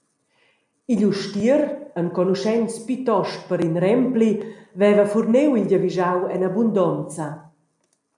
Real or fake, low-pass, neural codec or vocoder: real; 10.8 kHz; none